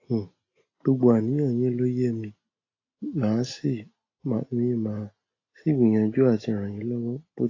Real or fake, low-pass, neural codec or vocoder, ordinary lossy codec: real; 7.2 kHz; none; AAC, 32 kbps